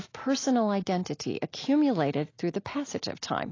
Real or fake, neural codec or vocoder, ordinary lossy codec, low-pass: real; none; AAC, 32 kbps; 7.2 kHz